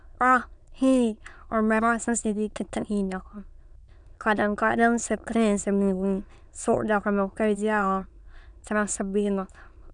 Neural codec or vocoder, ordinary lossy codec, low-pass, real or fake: autoencoder, 22.05 kHz, a latent of 192 numbers a frame, VITS, trained on many speakers; none; 9.9 kHz; fake